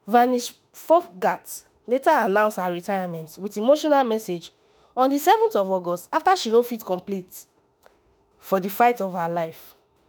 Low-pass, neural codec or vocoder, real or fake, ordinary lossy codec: none; autoencoder, 48 kHz, 32 numbers a frame, DAC-VAE, trained on Japanese speech; fake; none